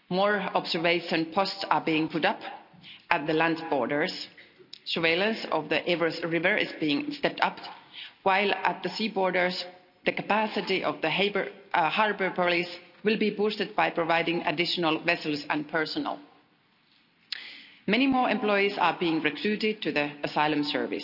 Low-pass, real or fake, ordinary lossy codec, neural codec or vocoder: 5.4 kHz; real; none; none